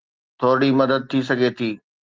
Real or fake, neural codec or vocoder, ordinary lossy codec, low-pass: real; none; Opus, 32 kbps; 7.2 kHz